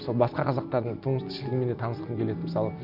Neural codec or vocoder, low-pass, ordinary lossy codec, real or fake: none; 5.4 kHz; none; real